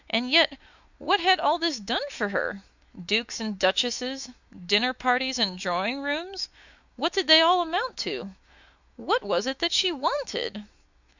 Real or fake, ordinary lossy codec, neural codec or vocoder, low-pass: fake; Opus, 64 kbps; autoencoder, 48 kHz, 128 numbers a frame, DAC-VAE, trained on Japanese speech; 7.2 kHz